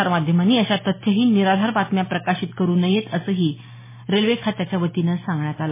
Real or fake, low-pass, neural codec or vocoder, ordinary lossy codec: real; 3.6 kHz; none; MP3, 16 kbps